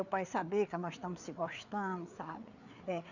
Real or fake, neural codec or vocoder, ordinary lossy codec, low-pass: fake; codec, 16 kHz, 4 kbps, FreqCodec, larger model; none; 7.2 kHz